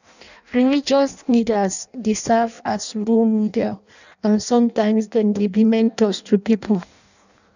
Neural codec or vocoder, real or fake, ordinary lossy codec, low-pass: codec, 16 kHz in and 24 kHz out, 0.6 kbps, FireRedTTS-2 codec; fake; none; 7.2 kHz